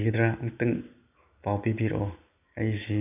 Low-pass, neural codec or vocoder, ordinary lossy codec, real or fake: 3.6 kHz; none; AAC, 32 kbps; real